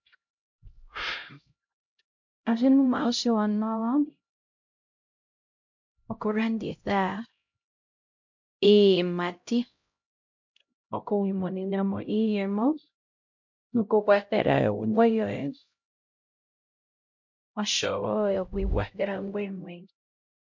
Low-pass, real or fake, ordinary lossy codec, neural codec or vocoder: 7.2 kHz; fake; MP3, 48 kbps; codec, 16 kHz, 0.5 kbps, X-Codec, HuBERT features, trained on LibriSpeech